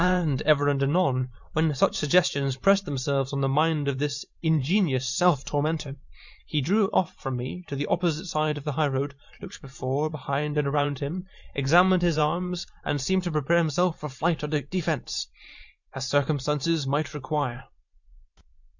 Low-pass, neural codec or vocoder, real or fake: 7.2 kHz; vocoder, 44.1 kHz, 128 mel bands every 512 samples, BigVGAN v2; fake